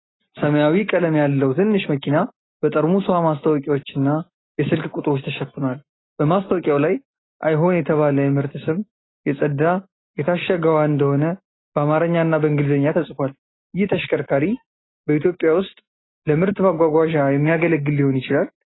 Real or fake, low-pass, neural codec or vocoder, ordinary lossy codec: real; 7.2 kHz; none; AAC, 16 kbps